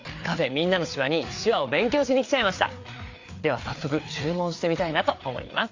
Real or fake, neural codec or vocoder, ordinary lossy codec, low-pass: fake; codec, 16 kHz, 4 kbps, FreqCodec, larger model; AAC, 48 kbps; 7.2 kHz